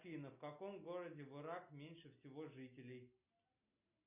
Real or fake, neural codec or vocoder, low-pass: real; none; 3.6 kHz